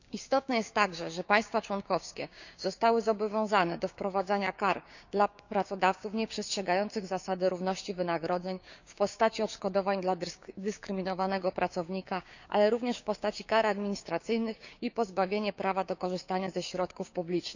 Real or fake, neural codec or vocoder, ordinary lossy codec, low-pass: fake; codec, 16 kHz, 6 kbps, DAC; none; 7.2 kHz